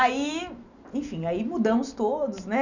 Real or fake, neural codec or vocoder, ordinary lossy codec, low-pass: real; none; none; 7.2 kHz